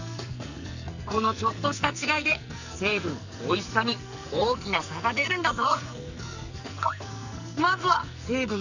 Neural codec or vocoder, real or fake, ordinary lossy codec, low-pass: codec, 44.1 kHz, 2.6 kbps, SNAC; fake; none; 7.2 kHz